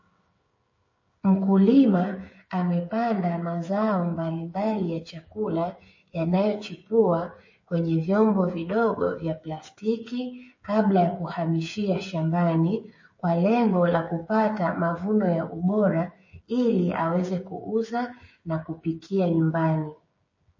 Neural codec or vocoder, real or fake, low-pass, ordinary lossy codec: codec, 16 kHz, 8 kbps, FreqCodec, smaller model; fake; 7.2 kHz; MP3, 32 kbps